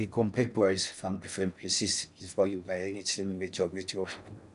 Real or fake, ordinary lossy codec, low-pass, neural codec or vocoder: fake; none; 10.8 kHz; codec, 16 kHz in and 24 kHz out, 0.6 kbps, FocalCodec, streaming, 4096 codes